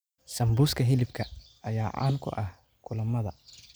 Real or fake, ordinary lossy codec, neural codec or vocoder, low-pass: real; none; none; none